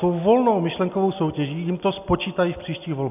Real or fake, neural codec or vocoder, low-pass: real; none; 3.6 kHz